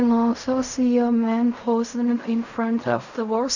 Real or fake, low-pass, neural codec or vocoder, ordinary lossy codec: fake; 7.2 kHz; codec, 16 kHz in and 24 kHz out, 0.4 kbps, LongCat-Audio-Codec, fine tuned four codebook decoder; none